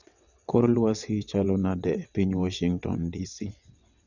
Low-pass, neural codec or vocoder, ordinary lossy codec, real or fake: 7.2 kHz; vocoder, 22.05 kHz, 80 mel bands, WaveNeXt; none; fake